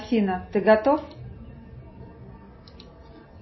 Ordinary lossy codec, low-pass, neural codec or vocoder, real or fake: MP3, 24 kbps; 7.2 kHz; none; real